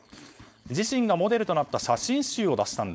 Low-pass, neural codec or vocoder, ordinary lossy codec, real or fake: none; codec, 16 kHz, 4.8 kbps, FACodec; none; fake